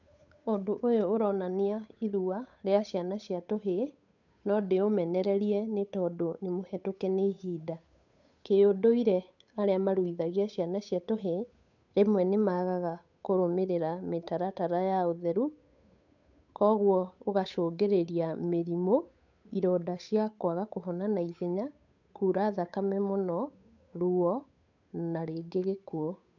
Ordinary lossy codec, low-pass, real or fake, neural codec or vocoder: none; 7.2 kHz; fake; codec, 16 kHz, 8 kbps, FunCodec, trained on Chinese and English, 25 frames a second